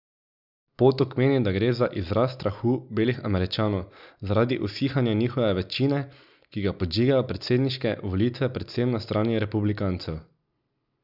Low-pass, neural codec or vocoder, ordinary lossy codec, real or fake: 5.4 kHz; none; none; real